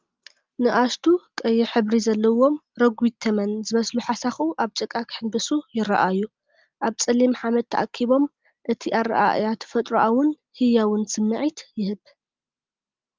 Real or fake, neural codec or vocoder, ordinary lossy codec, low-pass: real; none; Opus, 24 kbps; 7.2 kHz